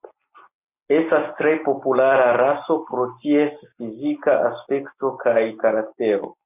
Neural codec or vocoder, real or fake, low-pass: none; real; 3.6 kHz